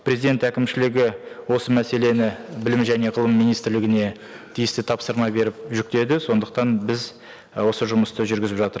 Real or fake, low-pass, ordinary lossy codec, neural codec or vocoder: real; none; none; none